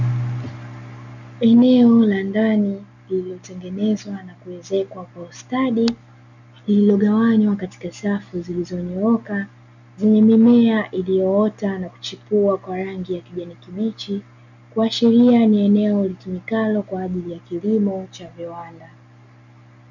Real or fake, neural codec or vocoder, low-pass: real; none; 7.2 kHz